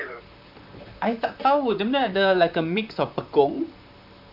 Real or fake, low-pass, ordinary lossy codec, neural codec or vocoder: fake; 5.4 kHz; none; vocoder, 44.1 kHz, 128 mel bands, Pupu-Vocoder